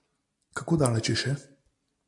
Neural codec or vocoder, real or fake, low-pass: none; real; 10.8 kHz